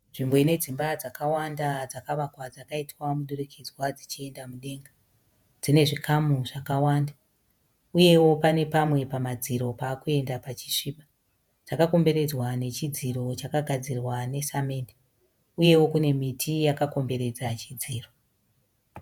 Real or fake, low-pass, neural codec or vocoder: fake; 19.8 kHz; vocoder, 48 kHz, 128 mel bands, Vocos